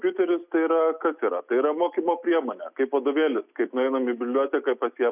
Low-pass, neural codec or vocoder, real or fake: 3.6 kHz; none; real